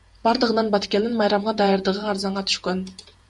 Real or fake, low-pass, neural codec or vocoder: fake; 10.8 kHz; vocoder, 48 kHz, 128 mel bands, Vocos